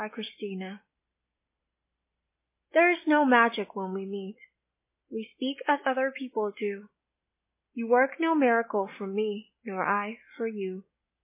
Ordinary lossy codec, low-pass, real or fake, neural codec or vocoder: MP3, 24 kbps; 3.6 kHz; real; none